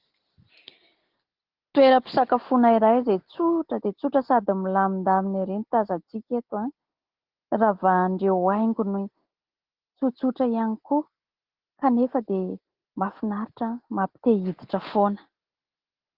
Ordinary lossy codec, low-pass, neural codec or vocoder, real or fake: Opus, 16 kbps; 5.4 kHz; none; real